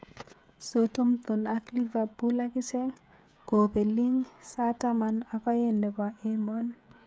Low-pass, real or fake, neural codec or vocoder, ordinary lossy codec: none; fake; codec, 16 kHz, 16 kbps, FreqCodec, smaller model; none